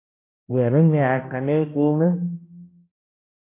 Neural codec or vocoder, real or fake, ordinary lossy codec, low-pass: codec, 16 kHz, 1 kbps, X-Codec, HuBERT features, trained on balanced general audio; fake; MP3, 24 kbps; 3.6 kHz